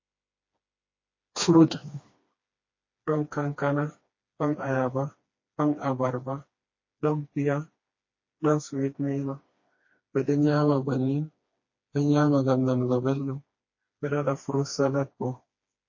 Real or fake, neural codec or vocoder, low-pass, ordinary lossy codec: fake; codec, 16 kHz, 2 kbps, FreqCodec, smaller model; 7.2 kHz; MP3, 32 kbps